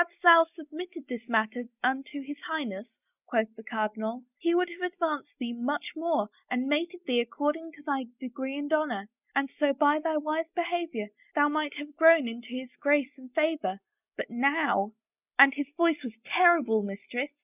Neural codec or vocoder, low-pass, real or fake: none; 3.6 kHz; real